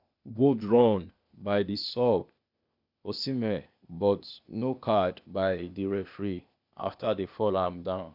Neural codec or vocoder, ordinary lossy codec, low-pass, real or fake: codec, 16 kHz, 0.8 kbps, ZipCodec; none; 5.4 kHz; fake